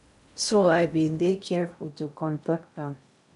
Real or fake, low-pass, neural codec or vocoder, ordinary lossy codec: fake; 10.8 kHz; codec, 16 kHz in and 24 kHz out, 0.6 kbps, FocalCodec, streaming, 2048 codes; none